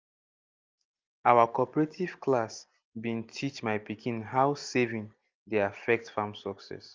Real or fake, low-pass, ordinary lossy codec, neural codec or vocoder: real; 7.2 kHz; Opus, 32 kbps; none